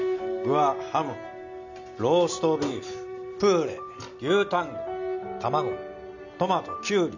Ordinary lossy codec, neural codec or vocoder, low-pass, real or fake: none; none; 7.2 kHz; real